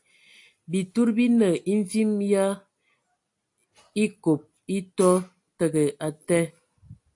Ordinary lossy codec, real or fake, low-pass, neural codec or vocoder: AAC, 64 kbps; real; 10.8 kHz; none